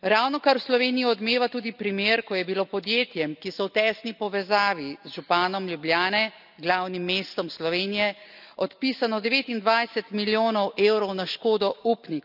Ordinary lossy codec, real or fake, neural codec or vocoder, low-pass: none; real; none; 5.4 kHz